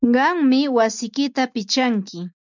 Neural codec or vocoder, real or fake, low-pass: none; real; 7.2 kHz